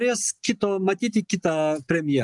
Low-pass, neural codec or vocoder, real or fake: 10.8 kHz; none; real